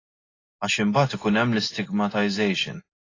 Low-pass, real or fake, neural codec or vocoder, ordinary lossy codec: 7.2 kHz; real; none; AAC, 32 kbps